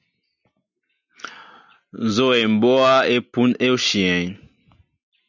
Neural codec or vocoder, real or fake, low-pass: none; real; 7.2 kHz